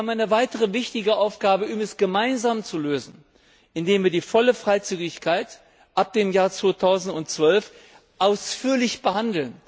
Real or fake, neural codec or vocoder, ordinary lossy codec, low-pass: real; none; none; none